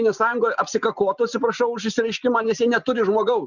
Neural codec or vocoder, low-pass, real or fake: none; 7.2 kHz; real